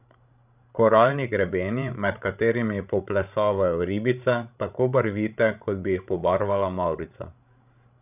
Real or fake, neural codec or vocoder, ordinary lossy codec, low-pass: fake; codec, 16 kHz, 16 kbps, FreqCodec, larger model; none; 3.6 kHz